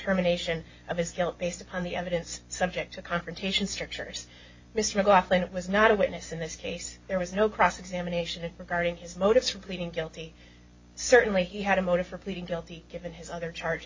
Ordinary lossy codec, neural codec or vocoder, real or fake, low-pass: MP3, 32 kbps; none; real; 7.2 kHz